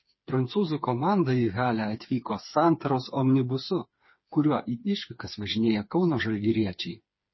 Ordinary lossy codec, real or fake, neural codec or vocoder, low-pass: MP3, 24 kbps; fake; codec, 16 kHz, 4 kbps, FreqCodec, smaller model; 7.2 kHz